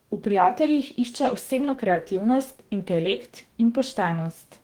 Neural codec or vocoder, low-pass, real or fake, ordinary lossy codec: codec, 44.1 kHz, 2.6 kbps, DAC; 19.8 kHz; fake; Opus, 24 kbps